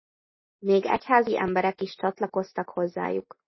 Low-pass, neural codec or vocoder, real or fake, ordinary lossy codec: 7.2 kHz; none; real; MP3, 24 kbps